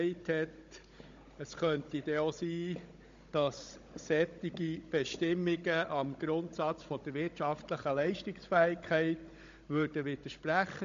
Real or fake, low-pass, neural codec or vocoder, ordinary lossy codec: fake; 7.2 kHz; codec, 16 kHz, 16 kbps, FunCodec, trained on Chinese and English, 50 frames a second; MP3, 48 kbps